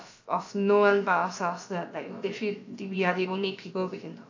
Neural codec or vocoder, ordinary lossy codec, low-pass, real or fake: codec, 16 kHz, about 1 kbps, DyCAST, with the encoder's durations; AAC, 48 kbps; 7.2 kHz; fake